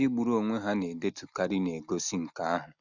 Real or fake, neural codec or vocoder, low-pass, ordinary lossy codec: real; none; 7.2 kHz; none